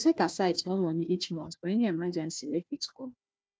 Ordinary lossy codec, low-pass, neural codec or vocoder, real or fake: none; none; codec, 16 kHz, 1 kbps, FunCodec, trained on Chinese and English, 50 frames a second; fake